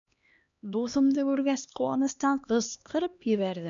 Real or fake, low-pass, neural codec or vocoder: fake; 7.2 kHz; codec, 16 kHz, 2 kbps, X-Codec, HuBERT features, trained on LibriSpeech